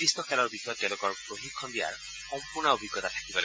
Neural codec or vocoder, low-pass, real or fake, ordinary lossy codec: none; none; real; none